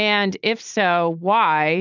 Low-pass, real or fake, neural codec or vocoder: 7.2 kHz; real; none